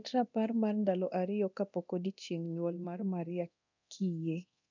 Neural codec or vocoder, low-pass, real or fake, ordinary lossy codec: codec, 24 kHz, 0.9 kbps, DualCodec; 7.2 kHz; fake; none